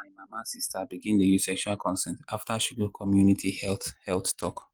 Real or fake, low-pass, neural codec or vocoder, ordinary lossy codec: fake; 19.8 kHz; vocoder, 44.1 kHz, 128 mel bands every 512 samples, BigVGAN v2; Opus, 24 kbps